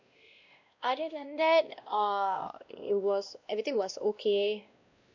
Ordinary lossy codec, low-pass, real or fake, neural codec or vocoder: none; 7.2 kHz; fake; codec, 16 kHz, 1 kbps, X-Codec, WavLM features, trained on Multilingual LibriSpeech